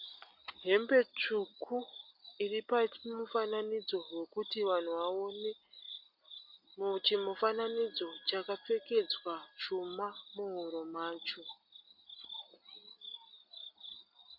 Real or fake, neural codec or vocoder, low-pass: real; none; 5.4 kHz